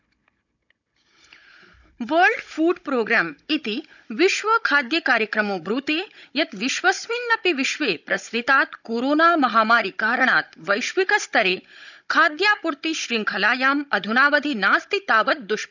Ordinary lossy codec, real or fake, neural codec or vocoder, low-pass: none; fake; codec, 16 kHz, 16 kbps, FunCodec, trained on Chinese and English, 50 frames a second; 7.2 kHz